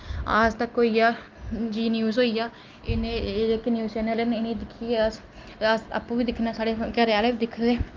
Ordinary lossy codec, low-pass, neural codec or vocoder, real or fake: Opus, 32 kbps; 7.2 kHz; none; real